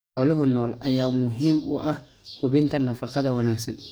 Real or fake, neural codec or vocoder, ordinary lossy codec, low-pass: fake; codec, 44.1 kHz, 2.6 kbps, DAC; none; none